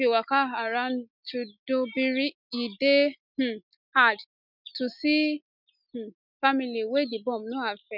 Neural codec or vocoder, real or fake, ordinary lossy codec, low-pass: none; real; none; 5.4 kHz